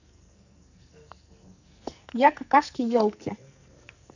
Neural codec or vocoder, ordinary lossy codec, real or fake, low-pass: codec, 44.1 kHz, 2.6 kbps, SNAC; none; fake; 7.2 kHz